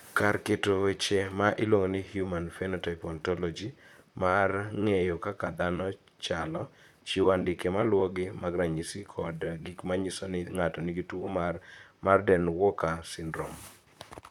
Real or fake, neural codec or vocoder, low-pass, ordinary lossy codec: fake; vocoder, 44.1 kHz, 128 mel bands, Pupu-Vocoder; 19.8 kHz; none